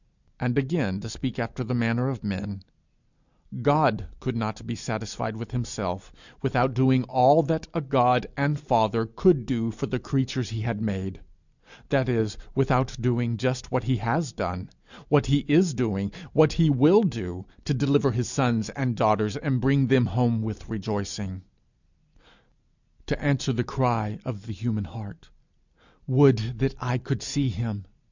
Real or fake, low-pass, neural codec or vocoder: real; 7.2 kHz; none